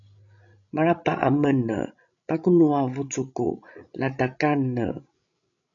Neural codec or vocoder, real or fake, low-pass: codec, 16 kHz, 16 kbps, FreqCodec, larger model; fake; 7.2 kHz